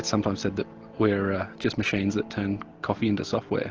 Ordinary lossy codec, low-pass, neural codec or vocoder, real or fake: Opus, 16 kbps; 7.2 kHz; none; real